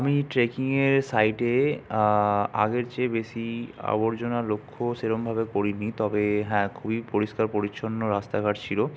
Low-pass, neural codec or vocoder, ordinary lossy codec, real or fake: none; none; none; real